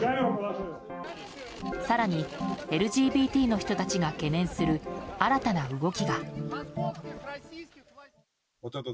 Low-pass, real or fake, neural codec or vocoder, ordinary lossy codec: none; real; none; none